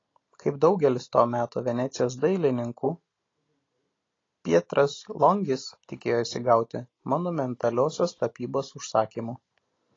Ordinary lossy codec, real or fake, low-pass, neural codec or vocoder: AAC, 32 kbps; real; 7.2 kHz; none